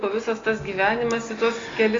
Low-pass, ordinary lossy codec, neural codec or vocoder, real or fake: 7.2 kHz; AAC, 32 kbps; none; real